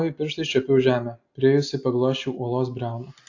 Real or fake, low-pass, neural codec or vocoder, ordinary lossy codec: real; 7.2 kHz; none; AAC, 48 kbps